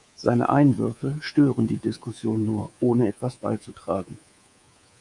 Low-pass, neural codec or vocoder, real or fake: 10.8 kHz; codec, 24 kHz, 3.1 kbps, DualCodec; fake